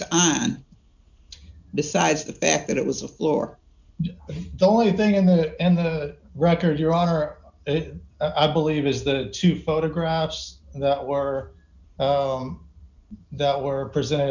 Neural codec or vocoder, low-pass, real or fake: none; 7.2 kHz; real